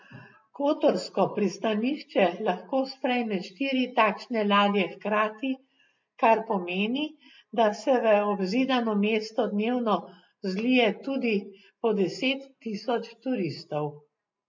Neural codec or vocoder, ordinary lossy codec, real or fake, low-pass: none; MP3, 48 kbps; real; 7.2 kHz